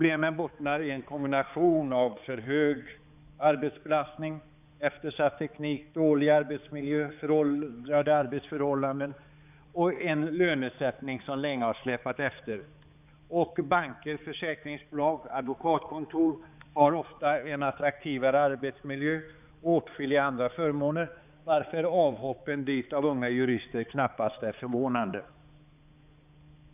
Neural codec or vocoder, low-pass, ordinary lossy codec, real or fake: codec, 16 kHz, 4 kbps, X-Codec, HuBERT features, trained on balanced general audio; 3.6 kHz; none; fake